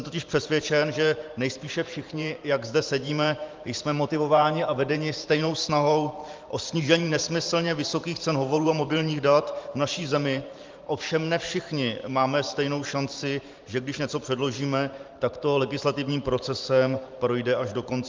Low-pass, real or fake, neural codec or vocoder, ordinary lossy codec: 7.2 kHz; fake; vocoder, 44.1 kHz, 128 mel bands every 512 samples, BigVGAN v2; Opus, 32 kbps